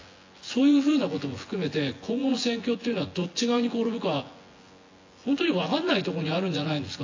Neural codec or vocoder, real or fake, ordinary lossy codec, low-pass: vocoder, 24 kHz, 100 mel bands, Vocos; fake; AAC, 32 kbps; 7.2 kHz